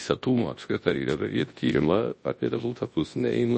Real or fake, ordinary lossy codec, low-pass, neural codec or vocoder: fake; MP3, 32 kbps; 10.8 kHz; codec, 24 kHz, 0.9 kbps, WavTokenizer, large speech release